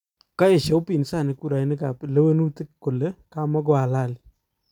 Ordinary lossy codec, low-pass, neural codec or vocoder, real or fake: none; 19.8 kHz; none; real